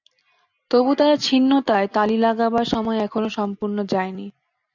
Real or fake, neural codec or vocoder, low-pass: real; none; 7.2 kHz